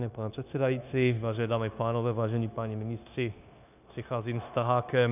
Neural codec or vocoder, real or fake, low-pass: codec, 16 kHz, 0.9 kbps, LongCat-Audio-Codec; fake; 3.6 kHz